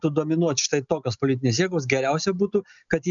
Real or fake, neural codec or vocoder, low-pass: real; none; 7.2 kHz